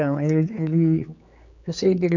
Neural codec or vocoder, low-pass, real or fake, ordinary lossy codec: codec, 16 kHz, 4 kbps, X-Codec, HuBERT features, trained on general audio; 7.2 kHz; fake; none